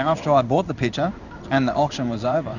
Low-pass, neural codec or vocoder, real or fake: 7.2 kHz; codec, 16 kHz in and 24 kHz out, 1 kbps, XY-Tokenizer; fake